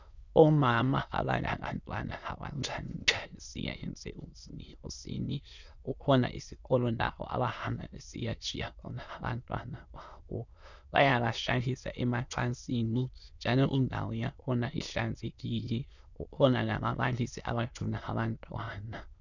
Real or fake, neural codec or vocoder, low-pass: fake; autoencoder, 22.05 kHz, a latent of 192 numbers a frame, VITS, trained on many speakers; 7.2 kHz